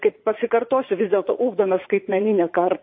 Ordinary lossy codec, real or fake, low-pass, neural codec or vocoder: MP3, 24 kbps; fake; 7.2 kHz; vocoder, 44.1 kHz, 80 mel bands, Vocos